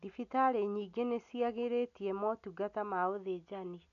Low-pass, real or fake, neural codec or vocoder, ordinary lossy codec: 7.2 kHz; real; none; none